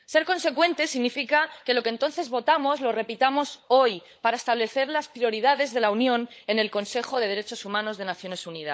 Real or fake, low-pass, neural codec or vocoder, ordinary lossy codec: fake; none; codec, 16 kHz, 16 kbps, FunCodec, trained on LibriTTS, 50 frames a second; none